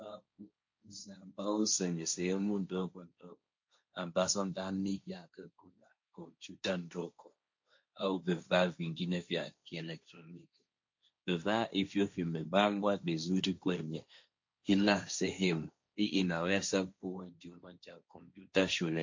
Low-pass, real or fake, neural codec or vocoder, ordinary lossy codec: 7.2 kHz; fake; codec, 16 kHz, 1.1 kbps, Voila-Tokenizer; MP3, 48 kbps